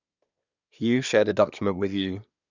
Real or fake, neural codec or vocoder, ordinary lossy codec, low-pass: fake; codec, 24 kHz, 1 kbps, SNAC; none; 7.2 kHz